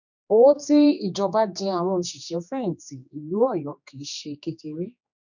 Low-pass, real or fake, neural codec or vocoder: 7.2 kHz; fake; codec, 16 kHz, 2 kbps, X-Codec, HuBERT features, trained on general audio